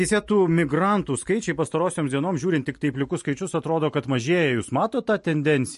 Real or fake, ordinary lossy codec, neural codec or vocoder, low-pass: real; MP3, 48 kbps; none; 14.4 kHz